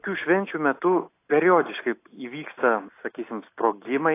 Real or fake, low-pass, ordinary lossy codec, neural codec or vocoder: real; 3.6 kHz; AAC, 24 kbps; none